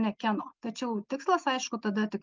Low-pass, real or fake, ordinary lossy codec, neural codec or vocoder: 7.2 kHz; real; Opus, 24 kbps; none